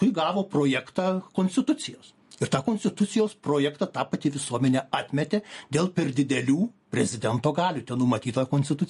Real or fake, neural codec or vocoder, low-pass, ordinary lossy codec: real; none; 14.4 kHz; MP3, 48 kbps